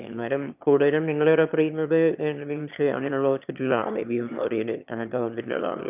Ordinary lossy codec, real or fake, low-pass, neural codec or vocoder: none; fake; 3.6 kHz; autoencoder, 22.05 kHz, a latent of 192 numbers a frame, VITS, trained on one speaker